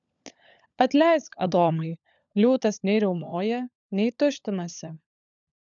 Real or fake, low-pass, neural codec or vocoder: fake; 7.2 kHz; codec, 16 kHz, 16 kbps, FunCodec, trained on LibriTTS, 50 frames a second